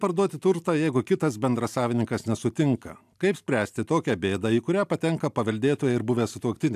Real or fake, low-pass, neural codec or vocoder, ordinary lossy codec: real; 14.4 kHz; none; AAC, 96 kbps